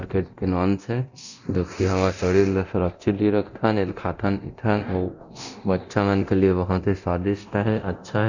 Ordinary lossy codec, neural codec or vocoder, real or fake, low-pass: none; codec, 24 kHz, 0.9 kbps, DualCodec; fake; 7.2 kHz